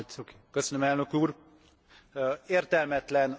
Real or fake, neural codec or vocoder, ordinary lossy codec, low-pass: real; none; none; none